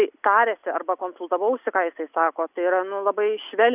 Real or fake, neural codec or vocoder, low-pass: real; none; 3.6 kHz